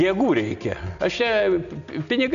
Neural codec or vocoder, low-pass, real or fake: none; 7.2 kHz; real